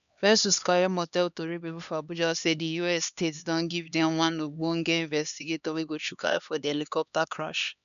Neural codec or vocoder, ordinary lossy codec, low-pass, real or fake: codec, 16 kHz, 2 kbps, X-Codec, HuBERT features, trained on LibriSpeech; none; 7.2 kHz; fake